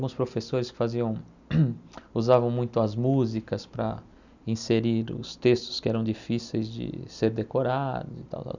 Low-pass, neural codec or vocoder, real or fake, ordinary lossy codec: 7.2 kHz; none; real; none